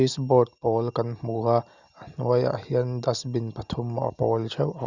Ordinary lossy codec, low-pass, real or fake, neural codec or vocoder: Opus, 64 kbps; 7.2 kHz; real; none